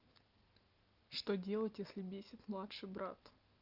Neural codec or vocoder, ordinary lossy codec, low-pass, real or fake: none; Opus, 32 kbps; 5.4 kHz; real